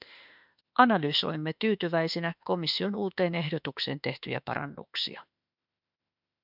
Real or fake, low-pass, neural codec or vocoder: fake; 5.4 kHz; autoencoder, 48 kHz, 32 numbers a frame, DAC-VAE, trained on Japanese speech